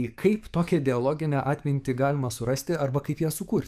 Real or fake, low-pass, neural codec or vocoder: fake; 14.4 kHz; codec, 44.1 kHz, 7.8 kbps, DAC